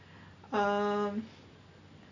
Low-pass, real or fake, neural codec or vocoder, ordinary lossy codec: 7.2 kHz; real; none; none